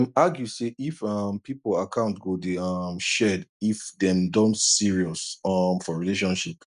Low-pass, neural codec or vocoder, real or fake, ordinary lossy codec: 10.8 kHz; none; real; none